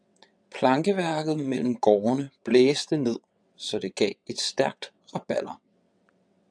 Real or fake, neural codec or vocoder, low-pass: fake; vocoder, 22.05 kHz, 80 mel bands, WaveNeXt; 9.9 kHz